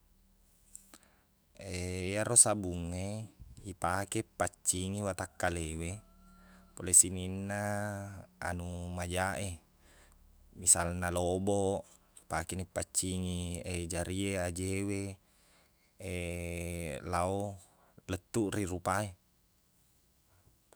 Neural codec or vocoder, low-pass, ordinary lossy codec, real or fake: autoencoder, 48 kHz, 128 numbers a frame, DAC-VAE, trained on Japanese speech; none; none; fake